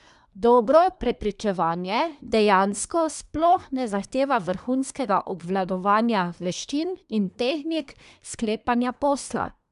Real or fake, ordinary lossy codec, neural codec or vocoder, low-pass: fake; none; codec, 24 kHz, 1 kbps, SNAC; 10.8 kHz